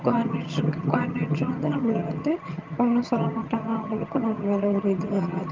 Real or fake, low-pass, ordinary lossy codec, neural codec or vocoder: fake; 7.2 kHz; Opus, 24 kbps; vocoder, 22.05 kHz, 80 mel bands, HiFi-GAN